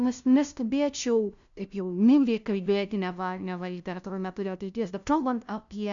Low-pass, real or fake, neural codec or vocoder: 7.2 kHz; fake; codec, 16 kHz, 0.5 kbps, FunCodec, trained on LibriTTS, 25 frames a second